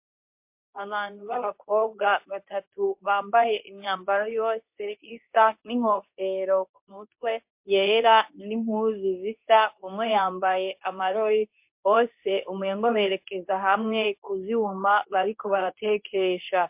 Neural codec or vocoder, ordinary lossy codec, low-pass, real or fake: codec, 24 kHz, 0.9 kbps, WavTokenizer, medium speech release version 2; MP3, 32 kbps; 3.6 kHz; fake